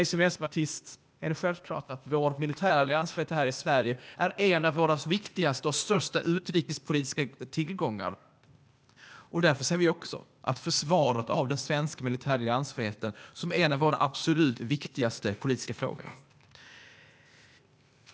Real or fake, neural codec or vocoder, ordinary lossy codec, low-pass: fake; codec, 16 kHz, 0.8 kbps, ZipCodec; none; none